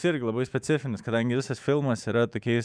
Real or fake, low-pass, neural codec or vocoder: fake; 9.9 kHz; autoencoder, 48 kHz, 128 numbers a frame, DAC-VAE, trained on Japanese speech